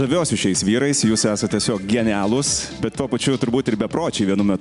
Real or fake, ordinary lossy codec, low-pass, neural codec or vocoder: real; MP3, 96 kbps; 10.8 kHz; none